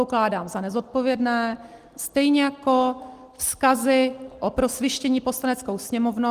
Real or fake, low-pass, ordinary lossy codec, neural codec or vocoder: real; 14.4 kHz; Opus, 24 kbps; none